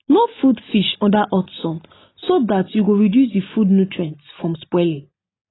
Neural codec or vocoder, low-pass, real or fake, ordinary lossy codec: none; 7.2 kHz; real; AAC, 16 kbps